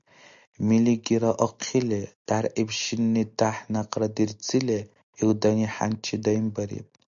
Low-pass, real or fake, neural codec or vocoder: 7.2 kHz; real; none